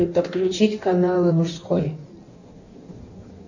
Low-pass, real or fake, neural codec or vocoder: 7.2 kHz; fake; codec, 16 kHz in and 24 kHz out, 1.1 kbps, FireRedTTS-2 codec